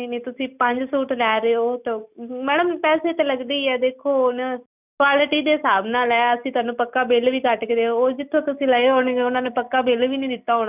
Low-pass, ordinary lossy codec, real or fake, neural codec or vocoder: 3.6 kHz; none; real; none